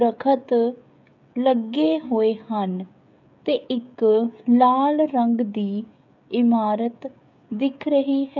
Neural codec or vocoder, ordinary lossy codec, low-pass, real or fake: codec, 16 kHz, 16 kbps, FreqCodec, smaller model; none; 7.2 kHz; fake